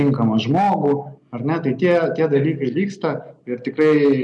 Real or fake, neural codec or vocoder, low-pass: real; none; 10.8 kHz